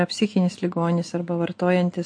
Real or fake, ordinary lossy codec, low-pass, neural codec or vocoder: real; MP3, 48 kbps; 9.9 kHz; none